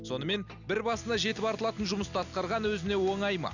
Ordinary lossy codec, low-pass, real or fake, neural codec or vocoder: none; 7.2 kHz; real; none